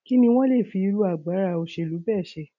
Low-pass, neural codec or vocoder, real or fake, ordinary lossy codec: 7.2 kHz; none; real; none